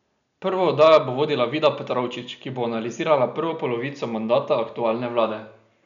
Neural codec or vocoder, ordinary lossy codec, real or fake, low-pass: none; none; real; 7.2 kHz